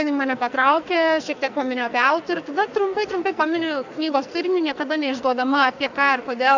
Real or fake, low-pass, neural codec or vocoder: fake; 7.2 kHz; codec, 44.1 kHz, 2.6 kbps, SNAC